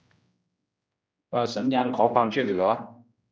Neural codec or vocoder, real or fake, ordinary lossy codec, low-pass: codec, 16 kHz, 1 kbps, X-Codec, HuBERT features, trained on general audio; fake; none; none